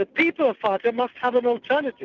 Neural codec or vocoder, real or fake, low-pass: none; real; 7.2 kHz